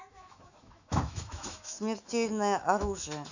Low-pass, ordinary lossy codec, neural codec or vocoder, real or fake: 7.2 kHz; none; autoencoder, 48 kHz, 128 numbers a frame, DAC-VAE, trained on Japanese speech; fake